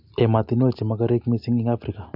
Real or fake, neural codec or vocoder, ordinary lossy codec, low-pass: real; none; none; 5.4 kHz